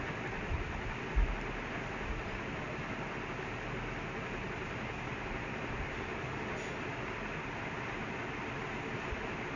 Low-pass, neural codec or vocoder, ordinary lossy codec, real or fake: 7.2 kHz; none; none; real